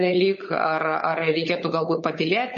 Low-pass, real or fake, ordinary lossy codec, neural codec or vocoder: 7.2 kHz; fake; MP3, 32 kbps; codec, 16 kHz, 16 kbps, FunCodec, trained on LibriTTS, 50 frames a second